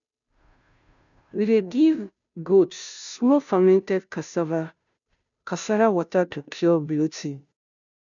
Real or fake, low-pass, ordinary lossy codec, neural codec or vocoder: fake; 7.2 kHz; none; codec, 16 kHz, 0.5 kbps, FunCodec, trained on Chinese and English, 25 frames a second